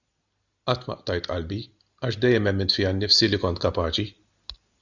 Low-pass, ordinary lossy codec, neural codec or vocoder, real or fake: 7.2 kHz; Opus, 64 kbps; none; real